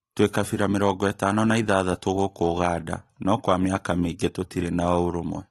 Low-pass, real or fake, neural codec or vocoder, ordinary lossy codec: 19.8 kHz; real; none; AAC, 32 kbps